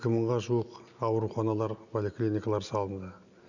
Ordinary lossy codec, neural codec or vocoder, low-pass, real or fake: none; none; 7.2 kHz; real